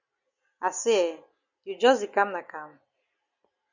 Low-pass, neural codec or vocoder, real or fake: 7.2 kHz; none; real